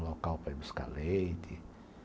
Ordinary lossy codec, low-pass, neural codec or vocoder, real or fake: none; none; none; real